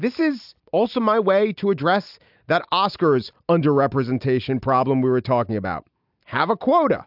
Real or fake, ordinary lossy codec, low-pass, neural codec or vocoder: real; AAC, 48 kbps; 5.4 kHz; none